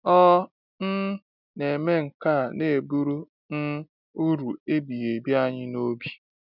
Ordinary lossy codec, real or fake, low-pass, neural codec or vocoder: none; real; 5.4 kHz; none